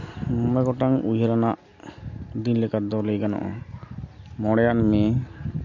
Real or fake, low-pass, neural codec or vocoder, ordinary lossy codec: real; 7.2 kHz; none; MP3, 48 kbps